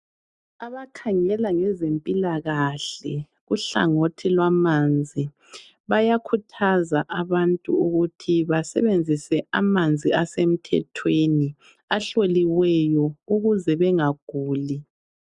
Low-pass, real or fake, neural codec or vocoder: 10.8 kHz; real; none